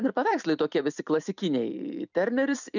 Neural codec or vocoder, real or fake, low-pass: vocoder, 44.1 kHz, 128 mel bands every 256 samples, BigVGAN v2; fake; 7.2 kHz